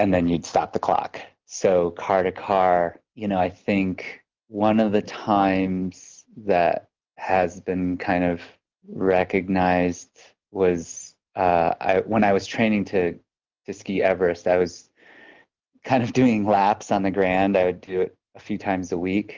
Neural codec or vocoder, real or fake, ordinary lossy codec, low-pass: none; real; Opus, 32 kbps; 7.2 kHz